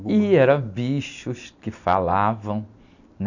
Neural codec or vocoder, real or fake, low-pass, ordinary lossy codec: none; real; 7.2 kHz; none